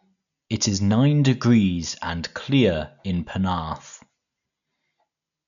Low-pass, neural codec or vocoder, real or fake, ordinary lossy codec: 7.2 kHz; none; real; none